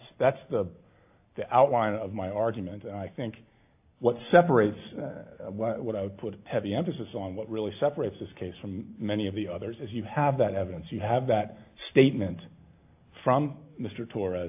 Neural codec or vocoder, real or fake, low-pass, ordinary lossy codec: none; real; 3.6 kHz; AAC, 32 kbps